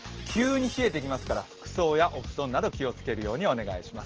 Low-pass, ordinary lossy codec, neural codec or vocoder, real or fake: 7.2 kHz; Opus, 16 kbps; none; real